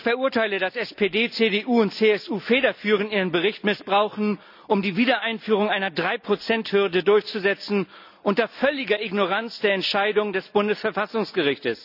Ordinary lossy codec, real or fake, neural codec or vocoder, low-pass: none; real; none; 5.4 kHz